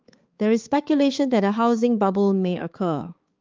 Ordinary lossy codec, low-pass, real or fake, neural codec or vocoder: Opus, 32 kbps; 7.2 kHz; fake; codec, 16 kHz, 4 kbps, X-Codec, HuBERT features, trained on balanced general audio